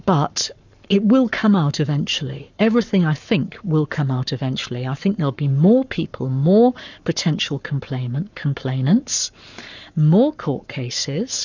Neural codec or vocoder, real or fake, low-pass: codec, 44.1 kHz, 7.8 kbps, Pupu-Codec; fake; 7.2 kHz